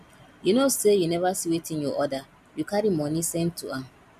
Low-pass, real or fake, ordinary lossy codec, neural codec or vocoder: 14.4 kHz; real; none; none